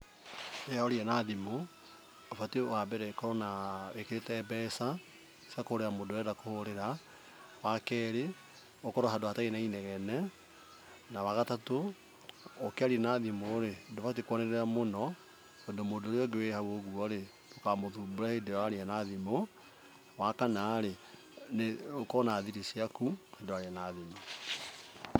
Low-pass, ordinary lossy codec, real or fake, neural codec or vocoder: none; none; real; none